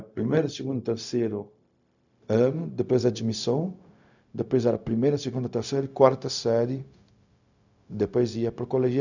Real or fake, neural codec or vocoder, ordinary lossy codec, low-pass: fake; codec, 16 kHz, 0.4 kbps, LongCat-Audio-Codec; none; 7.2 kHz